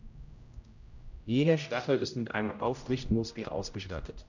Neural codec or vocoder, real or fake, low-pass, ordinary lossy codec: codec, 16 kHz, 0.5 kbps, X-Codec, HuBERT features, trained on general audio; fake; 7.2 kHz; none